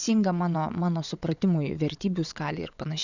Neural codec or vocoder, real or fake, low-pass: vocoder, 22.05 kHz, 80 mel bands, WaveNeXt; fake; 7.2 kHz